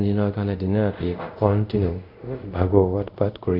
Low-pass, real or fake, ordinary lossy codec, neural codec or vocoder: 5.4 kHz; fake; none; codec, 24 kHz, 0.5 kbps, DualCodec